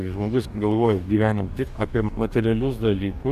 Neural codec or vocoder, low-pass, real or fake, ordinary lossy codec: codec, 44.1 kHz, 2.6 kbps, DAC; 14.4 kHz; fake; AAC, 96 kbps